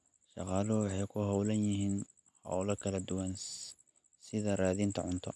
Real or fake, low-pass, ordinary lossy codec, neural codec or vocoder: real; none; none; none